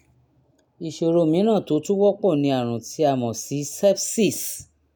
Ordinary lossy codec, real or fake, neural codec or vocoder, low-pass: none; real; none; none